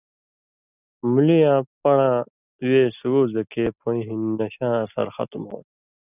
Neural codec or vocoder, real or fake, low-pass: none; real; 3.6 kHz